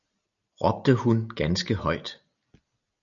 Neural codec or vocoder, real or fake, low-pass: none; real; 7.2 kHz